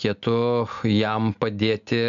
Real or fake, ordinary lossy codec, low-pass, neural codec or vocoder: real; MP3, 96 kbps; 7.2 kHz; none